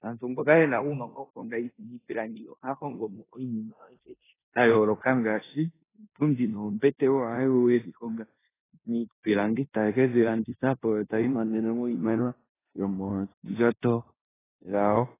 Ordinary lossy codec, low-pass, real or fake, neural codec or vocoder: AAC, 16 kbps; 3.6 kHz; fake; codec, 16 kHz in and 24 kHz out, 0.9 kbps, LongCat-Audio-Codec, four codebook decoder